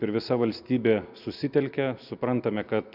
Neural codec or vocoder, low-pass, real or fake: none; 5.4 kHz; real